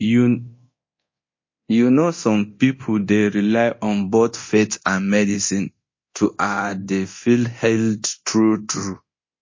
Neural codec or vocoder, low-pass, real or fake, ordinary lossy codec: codec, 24 kHz, 0.9 kbps, DualCodec; 7.2 kHz; fake; MP3, 32 kbps